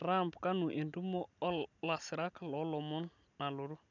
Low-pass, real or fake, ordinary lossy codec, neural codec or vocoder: 7.2 kHz; real; none; none